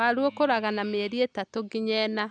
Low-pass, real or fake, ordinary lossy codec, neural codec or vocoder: 9.9 kHz; real; none; none